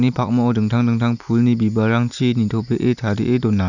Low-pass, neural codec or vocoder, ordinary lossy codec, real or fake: 7.2 kHz; none; none; real